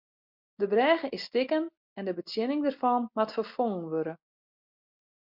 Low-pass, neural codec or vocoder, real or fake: 5.4 kHz; none; real